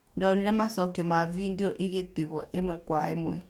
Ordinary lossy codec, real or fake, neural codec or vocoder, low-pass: none; fake; codec, 44.1 kHz, 2.6 kbps, DAC; 19.8 kHz